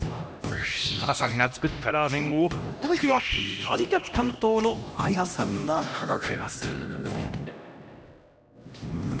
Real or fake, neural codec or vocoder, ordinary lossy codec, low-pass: fake; codec, 16 kHz, 1 kbps, X-Codec, HuBERT features, trained on LibriSpeech; none; none